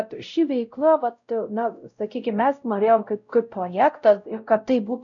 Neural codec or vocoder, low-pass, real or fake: codec, 16 kHz, 0.5 kbps, X-Codec, WavLM features, trained on Multilingual LibriSpeech; 7.2 kHz; fake